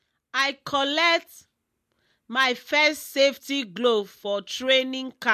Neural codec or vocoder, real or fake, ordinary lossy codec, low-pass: none; real; MP3, 64 kbps; 14.4 kHz